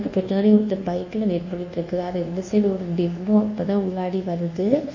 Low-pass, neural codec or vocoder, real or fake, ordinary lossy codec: 7.2 kHz; codec, 24 kHz, 1.2 kbps, DualCodec; fake; none